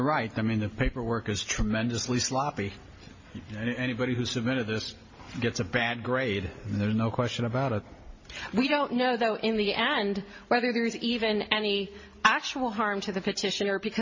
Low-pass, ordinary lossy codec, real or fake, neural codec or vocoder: 7.2 kHz; MP3, 32 kbps; real; none